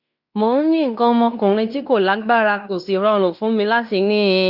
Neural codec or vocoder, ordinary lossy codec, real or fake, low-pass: codec, 16 kHz in and 24 kHz out, 0.9 kbps, LongCat-Audio-Codec, fine tuned four codebook decoder; Opus, 64 kbps; fake; 5.4 kHz